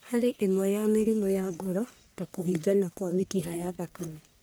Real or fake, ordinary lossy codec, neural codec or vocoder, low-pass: fake; none; codec, 44.1 kHz, 1.7 kbps, Pupu-Codec; none